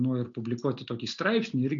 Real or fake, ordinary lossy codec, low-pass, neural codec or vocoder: real; MP3, 64 kbps; 7.2 kHz; none